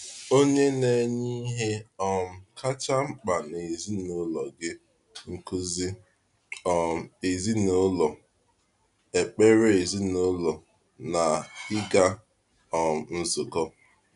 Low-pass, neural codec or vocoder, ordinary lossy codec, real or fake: 10.8 kHz; none; none; real